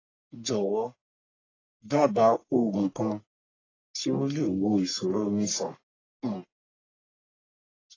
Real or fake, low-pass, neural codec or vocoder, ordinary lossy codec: fake; 7.2 kHz; codec, 44.1 kHz, 1.7 kbps, Pupu-Codec; AAC, 32 kbps